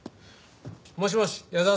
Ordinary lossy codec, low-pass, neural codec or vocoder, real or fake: none; none; none; real